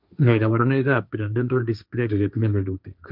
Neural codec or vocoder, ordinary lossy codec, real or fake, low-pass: codec, 16 kHz, 1.1 kbps, Voila-Tokenizer; none; fake; 5.4 kHz